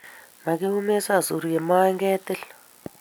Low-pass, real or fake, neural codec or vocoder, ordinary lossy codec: none; real; none; none